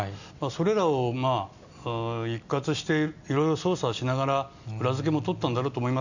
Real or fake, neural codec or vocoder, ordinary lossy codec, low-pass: real; none; none; 7.2 kHz